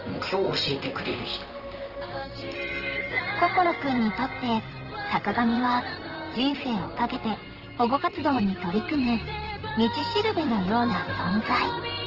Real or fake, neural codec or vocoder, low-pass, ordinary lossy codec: fake; vocoder, 44.1 kHz, 128 mel bands, Pupu-Vocoder; 5.4 kHz; Opus, 16 kbps